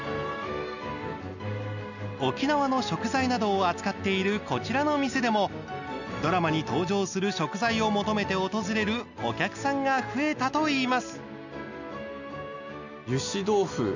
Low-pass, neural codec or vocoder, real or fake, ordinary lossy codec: 7.2 kHz; none; real; none